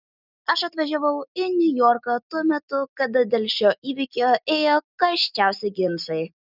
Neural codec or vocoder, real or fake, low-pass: none; real; 5.4 kHz